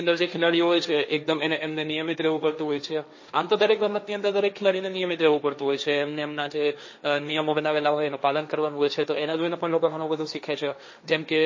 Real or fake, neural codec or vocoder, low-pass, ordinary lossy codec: fake; codec, 16 kHz, 1.1 kbps, Voila-Tokenizer; 7.2 kHz; MP3, 32 kbps